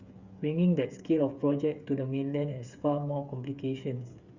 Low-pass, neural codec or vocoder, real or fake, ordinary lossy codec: 7.2 kHz; codec, 16 kHz, 8 kbps, FreqCodec, smaller model; fake; Opus, 64 kbps